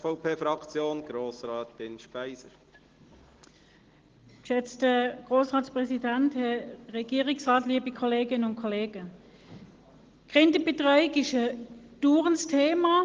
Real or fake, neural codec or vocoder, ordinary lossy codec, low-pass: real; none; Opus, 16 kbps; 7.2 kHz